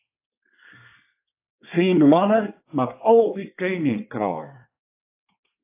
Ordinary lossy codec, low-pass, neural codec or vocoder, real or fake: AAC, 24 kbps; 3.6 kHz; codec, 24 kHz, 1 kbps, SNAC; fake